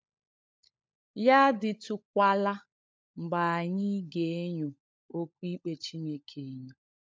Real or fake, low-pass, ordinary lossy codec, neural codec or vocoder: fake; none; none; codec, 16 kHz, 16 kbps, FunCodec, trained on LibriTTS, 50 frames a second